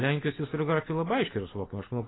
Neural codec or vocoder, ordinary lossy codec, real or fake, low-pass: none; AAC, 16 kbps; real; 7.2 kHz